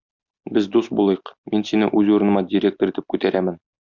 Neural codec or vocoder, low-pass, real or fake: none; 7.2 kHz; real